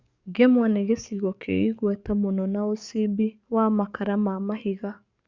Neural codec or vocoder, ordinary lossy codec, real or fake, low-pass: codec, 44.1 kHz, 7.8 kbps, DAC; Opus, 64 kbps; fake; 7.2 kHz